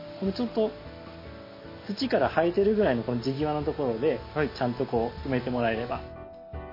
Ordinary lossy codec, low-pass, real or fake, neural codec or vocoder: MP3, 24 kbps; 5.4 kHz; real; none